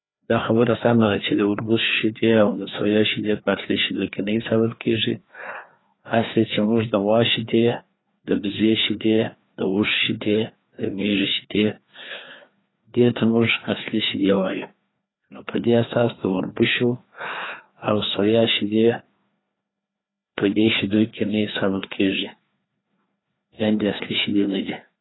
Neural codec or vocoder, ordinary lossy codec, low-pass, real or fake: codec, 16 kHz, 2 kbps, FreqCodec, larger model; AAC, 16 kbps; 7.2 kHz; fake